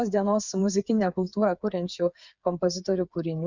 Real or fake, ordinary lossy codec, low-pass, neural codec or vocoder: fake; Opus, 64 kbps; 7.2 kHz; codec, 16 kHz, 8 kbps, FreqCodec, smaller model